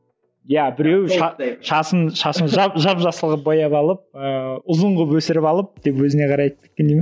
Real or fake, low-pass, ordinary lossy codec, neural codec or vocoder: real; none; none; none